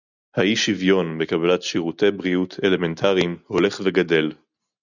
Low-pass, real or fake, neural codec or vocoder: 7.2 kHz; real; none